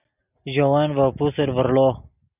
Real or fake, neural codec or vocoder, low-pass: real; none; 3.6 kHz